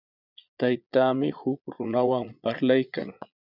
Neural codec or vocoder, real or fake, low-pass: vocoder, 22.05 kHz, 80 mel bands, Vocos; fake; 5.4 kHz